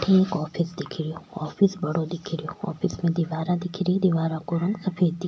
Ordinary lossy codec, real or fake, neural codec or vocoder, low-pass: none; real; none; none